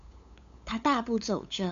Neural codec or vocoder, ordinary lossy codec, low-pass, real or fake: codec, 16 kHz, 8 kbps, FunCodec, trained on LibriTTS, 25 frames a second; MP3, 64 kbps; 7.2 kHz; fake